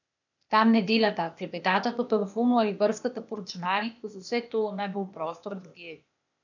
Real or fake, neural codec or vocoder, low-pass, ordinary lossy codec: fake; codec, 16 kHz, 0.8 kbps, ZipCodec; 7.2 kHz; none